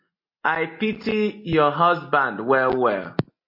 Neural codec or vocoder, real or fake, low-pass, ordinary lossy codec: none; real; 5.4 kHz; MP3, 32 kbps